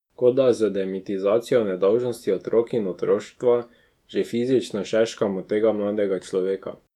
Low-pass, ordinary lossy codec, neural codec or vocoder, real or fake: 19.8 kHz; none; autoencoder, 48 kHz, 128 numbers a frame, DAC-VAE, trained on Japanese speech; fake